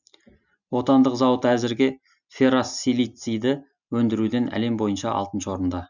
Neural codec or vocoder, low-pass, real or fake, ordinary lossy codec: none; 7.2 kHz; real; none